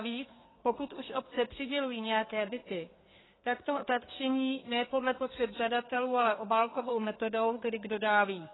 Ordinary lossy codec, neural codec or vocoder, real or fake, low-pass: AAC, 16 kbps; codec, 32 kHz, 1.9 kbps, SNAC; fake; 7.2 kHz